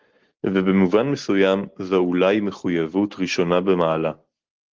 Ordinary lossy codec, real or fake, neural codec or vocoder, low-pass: Opus, 16 kbps; real; none; 7.2 kHz